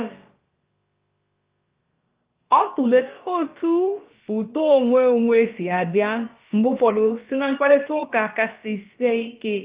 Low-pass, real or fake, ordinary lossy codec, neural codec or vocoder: 3.6 kHz; fake; Opus, 32 kbps; codec, 16 kHz, about 1 kbps, DyCAST, with the encoder's durations